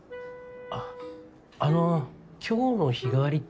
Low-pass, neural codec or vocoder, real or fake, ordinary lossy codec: none; none; real; none